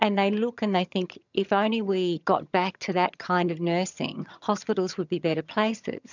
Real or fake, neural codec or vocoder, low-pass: fake; vocoder, 22.05 kHz, 80 mel bands, HiFi-GAN; 7.2 kHz